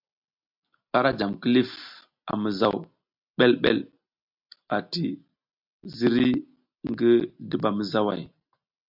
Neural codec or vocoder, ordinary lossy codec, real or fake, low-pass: none; MP3, 48 kbps; real; 5.4 kHz